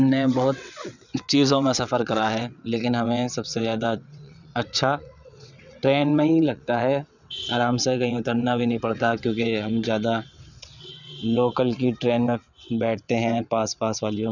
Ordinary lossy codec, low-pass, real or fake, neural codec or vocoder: none; 7.2 kHz; fake; vocoder, 22.05 kHz, 80 mel bands, WaveNeXt